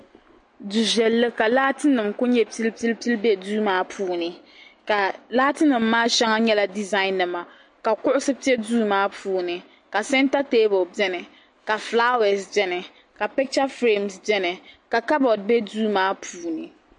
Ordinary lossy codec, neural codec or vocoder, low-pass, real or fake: MP3, 48 kbps; none; 9.9 kHz; real